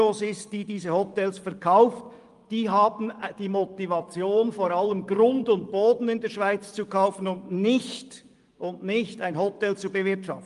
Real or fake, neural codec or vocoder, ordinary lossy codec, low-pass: fake; vocoder, 24 kHz, 100 mel bands, Vocos; Opus, 32 kbps; 10.8 kHz